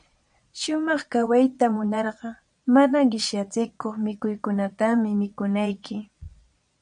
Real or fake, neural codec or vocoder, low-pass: fake; vocoder, 22.05 kHz, 80 mel bands, Vocos; 9.9 kHz